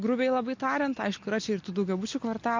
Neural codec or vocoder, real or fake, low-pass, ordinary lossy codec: none; real; 7.2 kHz; MP3, 48 kbps